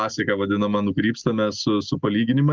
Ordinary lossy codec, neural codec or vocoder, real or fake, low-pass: Opus, 32 kbps; none; real; 7.2 kHz